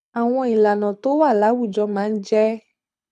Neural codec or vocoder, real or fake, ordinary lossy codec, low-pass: codec, 24 kHz, 6 kbps, HILCodec; fake; none; none